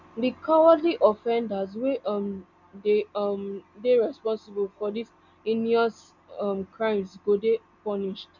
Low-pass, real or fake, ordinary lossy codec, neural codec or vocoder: 7.2 kHz; real; none; none